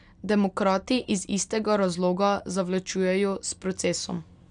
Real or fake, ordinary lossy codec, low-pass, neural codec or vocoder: real; none; 9.9 kHz; none